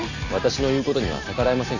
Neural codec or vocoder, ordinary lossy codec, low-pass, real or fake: none; none; 7.2 kHz; real